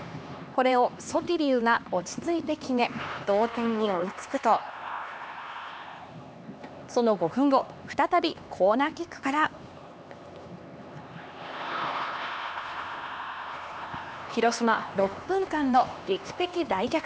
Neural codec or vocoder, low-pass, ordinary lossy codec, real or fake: codec, 16 kHz, 2 kbps, X-Codec, HuBERT features, trained on LibriSpeech; none; none; fake